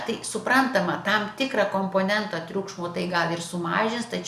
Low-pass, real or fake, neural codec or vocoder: 14.4 kHz; real; none